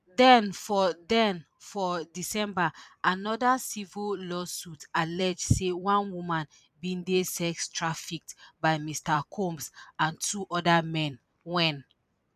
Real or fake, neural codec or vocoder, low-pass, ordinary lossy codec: fake; vocoder, 44.1 kHz, 128 mel bands every 256 samples, BigVGAN v2; 14.4 kHz; none